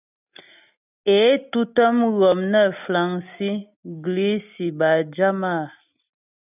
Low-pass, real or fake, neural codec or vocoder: 3.6 kHz; real; none